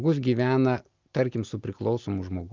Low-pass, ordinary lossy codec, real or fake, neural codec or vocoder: 7.2 kHz; Opus, 24 kbps; real; none